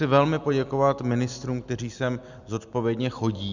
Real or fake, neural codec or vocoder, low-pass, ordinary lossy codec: real; none; 7.2 kHz; Opus, 64 kbps